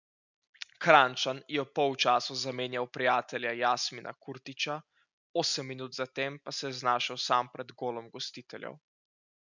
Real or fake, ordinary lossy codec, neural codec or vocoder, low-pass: real; none; none; 7.2 kHz